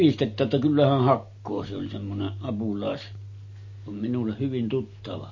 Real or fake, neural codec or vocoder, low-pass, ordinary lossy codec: real; none; 7.2 kHz; MP3, 32 kbps